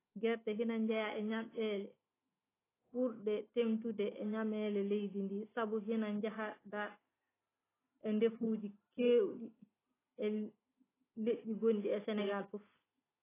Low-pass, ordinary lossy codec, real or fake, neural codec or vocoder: 3.6 kHz; AAC, 16 kbps; real; none